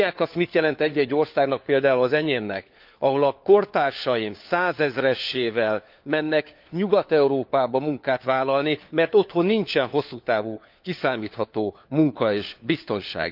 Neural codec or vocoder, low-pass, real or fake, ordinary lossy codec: codec, 16 kHz, 4 kbps, FunCodec, trained on LibriTTS, 50 frames a second; 5.4 kHz; fake; Opus, 24 kbps